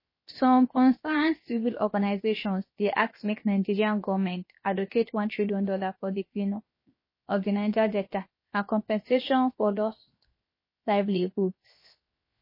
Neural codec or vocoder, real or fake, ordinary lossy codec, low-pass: codec, 16 kHz, 0.7 kbps, FocalCodec; fake; MP3, 24 kbps; 5.4 kHz